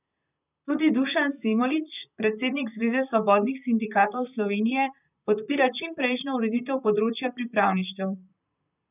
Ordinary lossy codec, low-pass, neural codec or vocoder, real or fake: none; 3.6 kHz; vocoder, 44.1 kHz, 128 mel bands, Pupu-Vocoder; fake